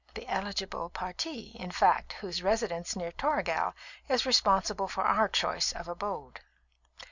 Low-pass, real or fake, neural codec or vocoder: 7.2 kHz; real; none